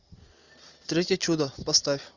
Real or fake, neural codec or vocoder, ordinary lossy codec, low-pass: real; none; Opus, 64 kbps; 7.2 kHz